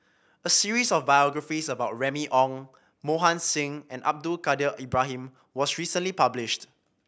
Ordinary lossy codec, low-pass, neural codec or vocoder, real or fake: none; none; none; real